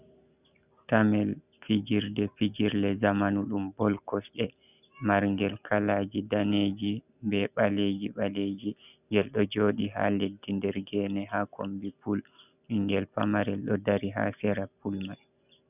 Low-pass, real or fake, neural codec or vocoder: 3.6 kHz; real; none